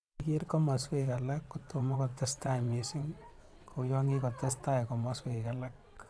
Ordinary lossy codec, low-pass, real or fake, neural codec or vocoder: none; none; fake; vocoder, 22.05 kHz, 80 mel bands, WaveNeXt